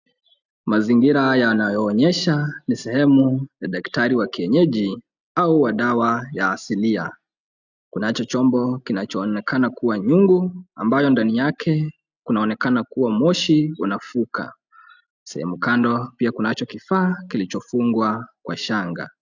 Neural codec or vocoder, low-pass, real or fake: none; 7.2 kHz; real